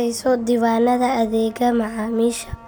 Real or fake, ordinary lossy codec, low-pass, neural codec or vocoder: real; none; none; none